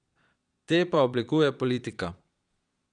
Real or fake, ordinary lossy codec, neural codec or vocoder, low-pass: fake; none; vocoder, 22.05 kHz, 80 mel bands, Vocos; 9.9 kHz